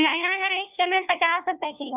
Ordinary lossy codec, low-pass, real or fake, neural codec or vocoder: none; 3.6 kHz; fake; codec, 16 kHz, 1 kbps, FunCodec, trained on LibriTTS, 50 frames a second